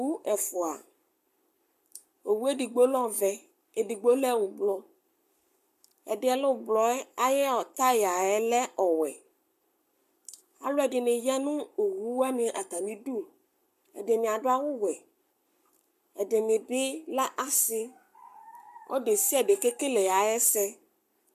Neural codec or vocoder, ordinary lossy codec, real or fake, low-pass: codec, 44.1 kHz, 7.8 kbps, Pupu-Codec; MP3, 96 kbps; fake; 14.4 kHz